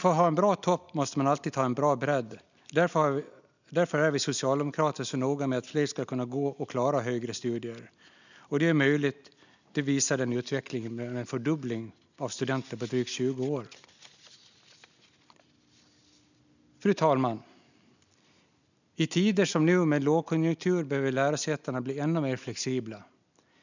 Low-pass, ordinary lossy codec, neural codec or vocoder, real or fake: 7.2 kHz; none; none; real